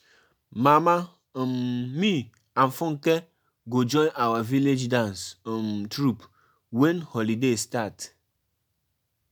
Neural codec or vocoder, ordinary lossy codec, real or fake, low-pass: none; none; real; none